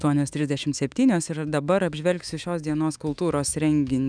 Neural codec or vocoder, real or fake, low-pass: autoencoder, 48 kHz, 128 numbers a frame, DAC-VAE, trained on Japanese speech; fake; 9.9 kHz